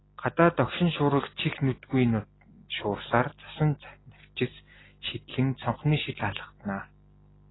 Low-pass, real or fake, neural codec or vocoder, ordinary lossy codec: 7.2 kHz; real; none; AAC, 16 kbps